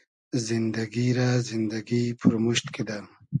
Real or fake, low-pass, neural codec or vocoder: real; 10.8 kHz; none